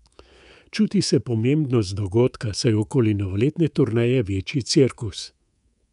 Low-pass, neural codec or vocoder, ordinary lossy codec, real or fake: 10.8 kHz; codec, 24 kHz, 3.1 kbps, DualCodec; none; fake